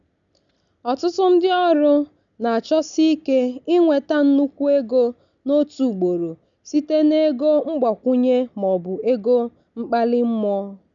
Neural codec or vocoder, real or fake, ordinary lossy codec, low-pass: none; real; none; 7.2 kHz